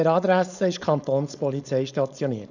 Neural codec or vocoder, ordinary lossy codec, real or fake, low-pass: codec, 16 kHz, 4.8 kbps, FACodec; none; fake; 7.2 kHz